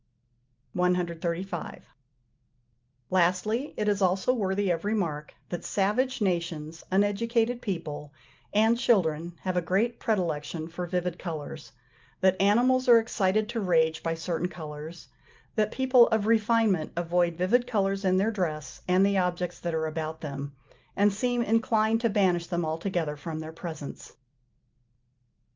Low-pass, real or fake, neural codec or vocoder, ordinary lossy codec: 7.2 kHz; real; none; Opus, 24 kbps